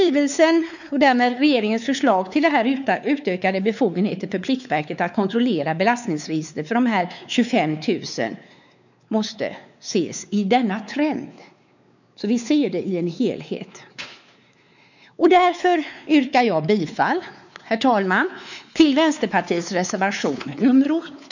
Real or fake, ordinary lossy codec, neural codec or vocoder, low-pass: fake; none; codec, 16 kHz, 4 kbps, X-Codec, WavLM features, trained on Multilingual LibriSpeech; 7.2 kHz